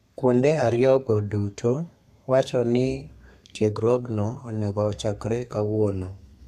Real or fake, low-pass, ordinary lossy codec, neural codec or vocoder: fake; 14.4 kHz; none; codec, 32 kHz, 1.9 kbps, SNAC